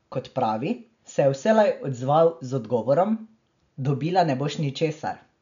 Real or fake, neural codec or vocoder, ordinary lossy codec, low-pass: real; none; none; 7.2 kHz